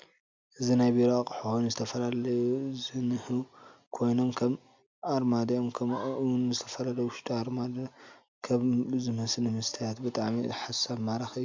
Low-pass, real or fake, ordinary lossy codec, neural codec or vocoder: 7.2 kHz; real; MP3, 64 kbps; none